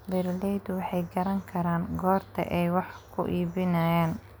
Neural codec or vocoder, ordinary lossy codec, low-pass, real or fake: none; none; none; real